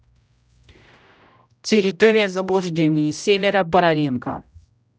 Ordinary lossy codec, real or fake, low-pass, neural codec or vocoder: none; fake; none; codec, 16 kHz, 0.5 kbps, X-Codec, HuBERT features, trained on general audio